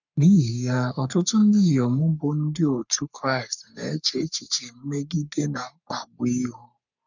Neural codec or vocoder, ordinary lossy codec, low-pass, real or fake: codec, 44.1 kHz, 3.4 kbps, Pupu-Codec; none; 7.2 kHz; fake